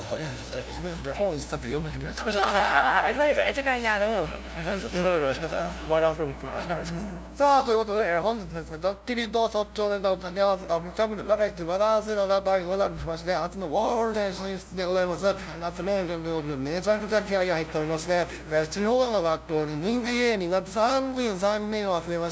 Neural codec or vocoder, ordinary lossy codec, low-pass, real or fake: codec, 16 kHz, 0.5 kbps, FunCodec, trained on LibriTTS, 25 frames a second; none; none; fake